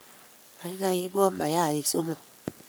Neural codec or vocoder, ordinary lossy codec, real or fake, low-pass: codec, 44.1 kHz, 3.4 kbps, Pupu-Codec; none; fake; none